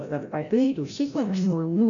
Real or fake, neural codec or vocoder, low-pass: fake; codec, 16 kHz, 0.5 kbps, FreqCodec, larger model; 7.2 kHz